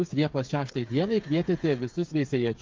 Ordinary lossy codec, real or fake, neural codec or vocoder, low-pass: Opus, 24 kbps; fake; codec, 16 kHz, 8 kbps, FreqCodec, smaller model; 7.2 kHz